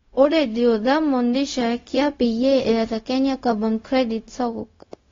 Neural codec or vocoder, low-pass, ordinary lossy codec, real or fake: codec, 16 kHz, 0.4 kbps, LongCat-Audio-Codec; 7.2 kHz; AAC, 32 kbps; fake